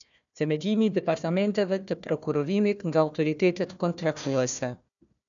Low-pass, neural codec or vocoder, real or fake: 7.2 kHz; codec, 16 kHz, 1 kbps, FunCodec, trained on Chinese and English, 50 frames a second; fake